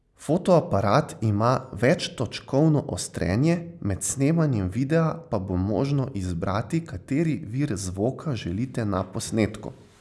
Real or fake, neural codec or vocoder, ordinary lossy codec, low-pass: real; none; none; none